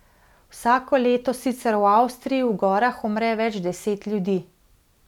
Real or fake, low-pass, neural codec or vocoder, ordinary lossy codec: real; 19.8 kHz; none; none